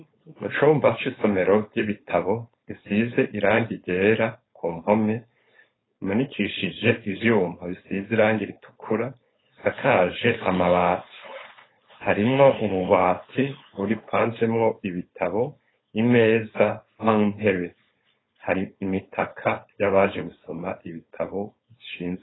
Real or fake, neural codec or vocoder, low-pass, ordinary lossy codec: fake; codec, 16 kHz, 4.8 kbps, FACodec; 7.2 kHz; AAC, 16 kbps